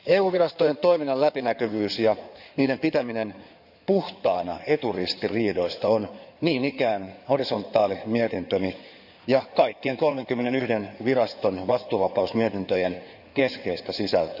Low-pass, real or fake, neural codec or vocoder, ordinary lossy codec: 5.4 kHz; fake; codec, 16 kHz in and 24 kHz out, 2.2 kbps, FireRedTTS-2 codec; none